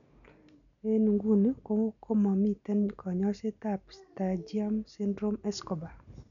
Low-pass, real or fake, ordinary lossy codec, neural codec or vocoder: 7.2 kHz; real; none; none